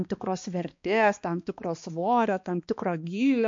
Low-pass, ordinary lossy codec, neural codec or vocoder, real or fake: 7.2 kHz; MP3, 48 kbps; codec, 16 kHz, 2 kbps, X-Codec, HuBERT features, trained on balanced general audio; fake